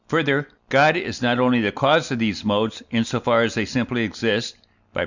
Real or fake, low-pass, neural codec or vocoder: real; 7.2 kHz; none